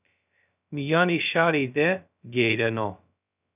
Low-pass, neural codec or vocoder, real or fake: 3.6 kHz; codec, 16 kHz, 0.2 kbps, FocalCodec; fake